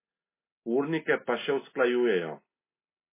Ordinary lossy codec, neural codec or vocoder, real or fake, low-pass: MP3, 16 kbps; none; real; 3.6 kHz